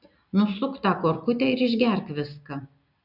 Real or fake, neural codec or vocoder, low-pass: real; none; 5.4 kHz